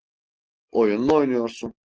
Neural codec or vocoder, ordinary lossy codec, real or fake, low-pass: none; Opus, 16 kbps; real; 7.2 kHz